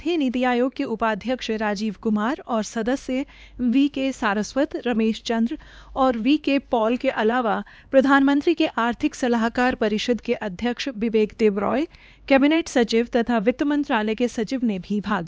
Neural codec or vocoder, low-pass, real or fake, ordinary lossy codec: codec, 16 kHz, 2 kbps, X-Codec, HuBERT features, trained on LibriSpeech; none; fake; none